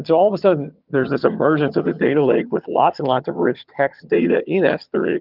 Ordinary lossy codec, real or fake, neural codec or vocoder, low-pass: Opus, 32 kbps; fake; vocoder, 22.05 kHz, 80 mel bands, HiFi-GAN; 5.4 kHz